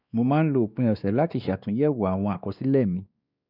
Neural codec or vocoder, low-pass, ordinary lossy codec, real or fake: codec, 16 kHz, 2 kbps, X-Codec, WavLM features, trained on Multilingual LibriSpeech; 5.4 kHz; none; fake